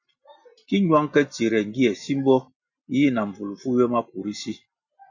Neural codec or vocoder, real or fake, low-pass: none; real; 7.2 kHz